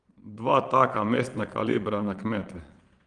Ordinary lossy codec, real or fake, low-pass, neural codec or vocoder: Opus, 24 kbps; fake; 9.9 kHz; vocoder, 22.05 kHz, 80 mel bands, Vocos